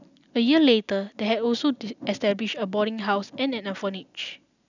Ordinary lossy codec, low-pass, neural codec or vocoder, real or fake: none; 7.2 kHz; none; real